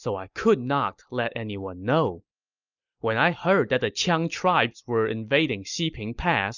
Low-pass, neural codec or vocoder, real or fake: 7.2 kHz; none; real